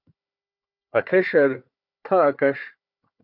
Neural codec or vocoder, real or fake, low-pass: codec, 16 kHz, 4 kbps, FunCodec, trained on Chinese and English, 50 frames a second; fake; 5.4 kHz